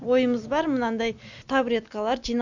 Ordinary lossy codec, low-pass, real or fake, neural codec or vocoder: none; 7.2 kHz; real; none